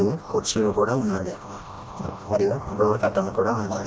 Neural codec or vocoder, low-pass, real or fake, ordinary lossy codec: codec, 16 kHz, 1 kbps, FreqCodec, smaller model; none; fake; none